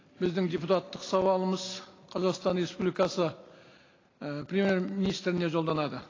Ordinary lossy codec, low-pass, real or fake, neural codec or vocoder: AAC, 32 kbps; 7.2 kHz; real; none